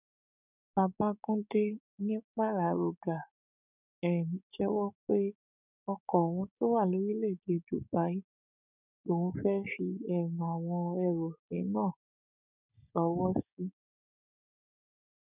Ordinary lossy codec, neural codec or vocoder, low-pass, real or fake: none; codec, 16 kHz, 6 kbps, DAC; 3.6 kHz; fake